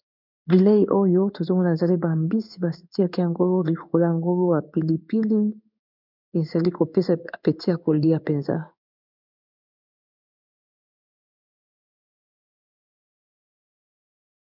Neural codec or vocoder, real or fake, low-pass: codec, 16 kHz in and 24 kHz out, 1 kbps, XY-Tokenizer; fake; 5.4 kHz